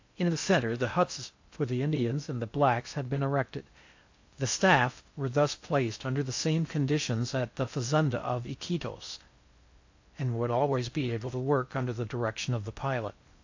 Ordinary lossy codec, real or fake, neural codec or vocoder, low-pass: AAC, 48 kbps; fake; codec, 16 kHz in and 24 kHz out, 0.6 kbps, FocalCodec, streaming, 2048 codes; 7.2 kHz